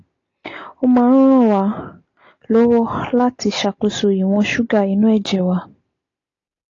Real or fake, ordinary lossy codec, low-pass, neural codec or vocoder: real; AAC, 32 kbps; 7.2 kHz; none